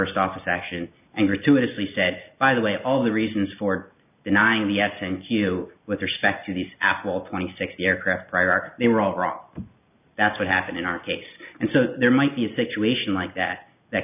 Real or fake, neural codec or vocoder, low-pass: real; none; 3.6 kHz